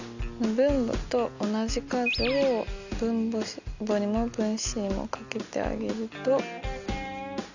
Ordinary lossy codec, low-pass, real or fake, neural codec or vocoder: none; 7.2 kHz; real; none